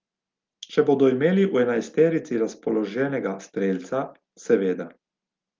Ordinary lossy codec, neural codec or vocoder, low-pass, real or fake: Opus, 32 kbps; none; 7.2 kHz; real